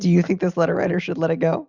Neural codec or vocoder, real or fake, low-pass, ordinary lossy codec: none; real; 7.2 kHz; Opus, 64 kbps